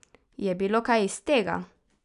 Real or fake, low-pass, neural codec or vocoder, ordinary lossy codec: real; 10.8 kHz; none; none